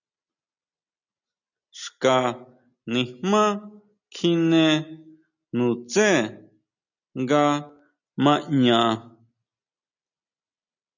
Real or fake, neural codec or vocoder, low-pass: real; none; 7.2 kHz